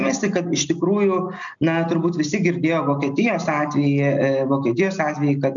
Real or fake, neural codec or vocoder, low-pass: real; none; 7.2 kHz